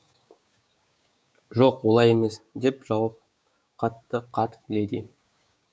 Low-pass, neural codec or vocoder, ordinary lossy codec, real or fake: none; codec, 16 kHz, 6 kbps, DAC; none; fake